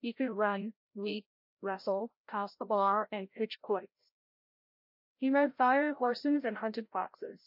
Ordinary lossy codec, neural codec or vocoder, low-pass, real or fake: MP3, 32 kbps; codec, 16 kHz, 0.5 kbps, FreqCodec, larger model; 5.4 kHz; fake